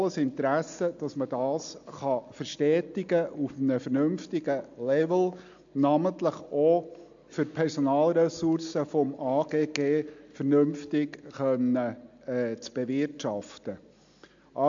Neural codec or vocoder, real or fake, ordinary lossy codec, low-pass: none; real; AAC, 64 kbps; 7.2 kHz